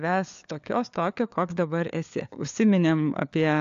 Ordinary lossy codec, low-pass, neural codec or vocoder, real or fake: AAC, 64 kbps; 7.2 kHz; codec, 16 kHz, 4 kbps, FunCodec, trained on LibriTTS, 50 frames a second; fake